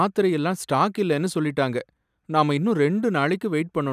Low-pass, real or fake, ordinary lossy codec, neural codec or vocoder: 14.4 kHz; real; none; none